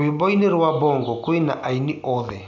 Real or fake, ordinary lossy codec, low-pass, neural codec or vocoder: real; none; 7.2 kHz; none